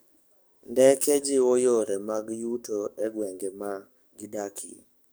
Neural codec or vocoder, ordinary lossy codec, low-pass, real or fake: codec, 44.1 kHz, 7.8 kbps, DAC; none; none; fake